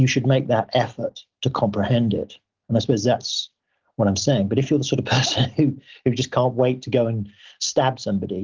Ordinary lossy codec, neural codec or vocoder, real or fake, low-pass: Opus, 16 kbps; none; real; 7.2 kHz